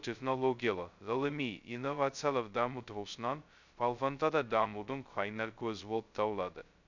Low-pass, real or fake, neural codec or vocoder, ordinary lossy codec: 7.2 kHz; fake; codec, 16 kHz, 0.2 kbps, FocalCodec; none